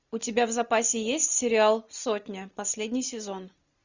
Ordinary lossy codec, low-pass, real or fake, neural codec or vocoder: Opus, 64 kbps; 7.2 kHz; real; none